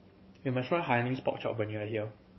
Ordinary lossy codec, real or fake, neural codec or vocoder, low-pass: MP3, 24 kbps; fake; codec, 44.1 kHz, 7.8 kbps, DAC; 7.2 kHz